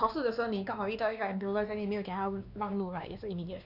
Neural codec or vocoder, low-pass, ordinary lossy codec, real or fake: codec, 16 kHz, 2 kbps, X-Codec, WavLM features, trained on Multilingual LibriSpeech; 5.4 kHz; none; fake